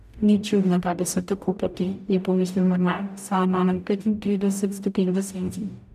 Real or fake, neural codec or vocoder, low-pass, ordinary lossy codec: fake; codec, 44.1 kHz, 0.9 kbps, DAC; 14.4 kHz; none